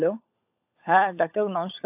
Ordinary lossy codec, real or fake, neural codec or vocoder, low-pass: AAC, 32 kbps; fake; codec, 16 kHz, 16 kbps, FunCodec, trained on LibriTTS, 50 frames a second; 3.6 kHz